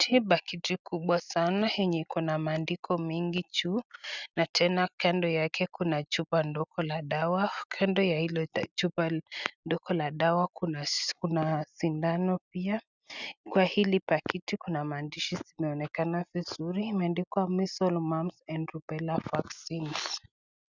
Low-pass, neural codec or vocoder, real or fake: 7.2 kHz; none; real